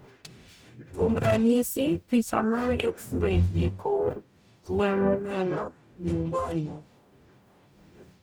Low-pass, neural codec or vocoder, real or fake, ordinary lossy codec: none; codec, 44.1 kHz, 0.9 kbps, DAC; fake; none